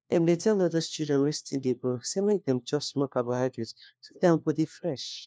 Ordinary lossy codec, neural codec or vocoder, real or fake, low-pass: none; codec, 16 kHz, 1 kbps, FunCodec, trained on LibriTTS, 50 frames a second; fake; none